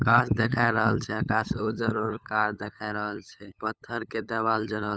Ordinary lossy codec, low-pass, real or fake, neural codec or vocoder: none; none; fake; codec, 16 kHz, 8 kbps, FunCodec, trained on LibriTTS, 25 frames a second